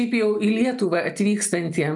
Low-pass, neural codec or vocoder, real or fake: 10.8 kHz; none; real